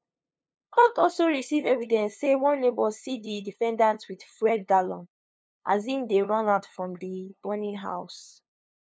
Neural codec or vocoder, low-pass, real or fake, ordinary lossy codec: codec, 16 kHz, 2 kbps, FunCodec, trained on LibriTTS, 25 frames a second; none; fake; none